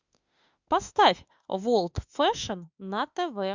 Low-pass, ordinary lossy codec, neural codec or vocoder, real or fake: 7.2 kHz; Opus, 64 kbps; autoencoder, 48 kHz, 32 numbers a frame, DAC-VAE, trained on Japanese speech; fake